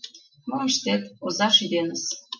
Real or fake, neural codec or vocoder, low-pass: real; none; 7.2 kHz